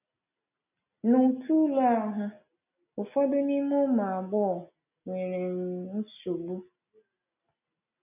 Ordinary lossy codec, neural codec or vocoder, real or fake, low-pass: none; none; real; 3.6 kHz